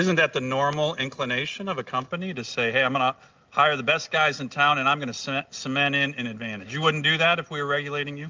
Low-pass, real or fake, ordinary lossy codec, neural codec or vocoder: 7.2 kHz; real; Opus, 32 kbps; none